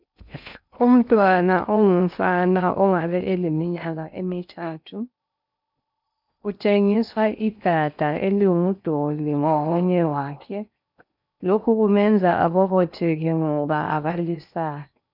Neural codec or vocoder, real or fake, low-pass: codec, 16 kHz in and 24 kHz out, 0.8 kbps, FocalCodec, streaming, 65536 codes; fake; 5.4 kHz